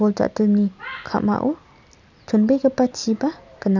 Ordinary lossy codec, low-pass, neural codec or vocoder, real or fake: none; 7.2 kHz; none; real